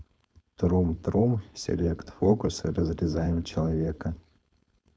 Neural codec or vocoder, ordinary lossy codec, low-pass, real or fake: codec, 16 kHz, 4.8 kbps, FACodec; none; none; fake